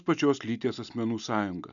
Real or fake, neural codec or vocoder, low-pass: real; none; 7.2 kHz